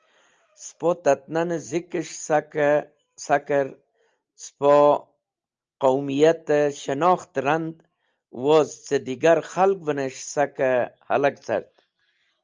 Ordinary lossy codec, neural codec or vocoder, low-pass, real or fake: Opus, 24 kbps; none; 7.2 kHz; real